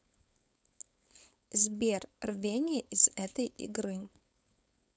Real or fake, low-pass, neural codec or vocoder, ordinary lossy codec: fake; none; codec, 16 kHz, 4.8 kbps, FACodec; none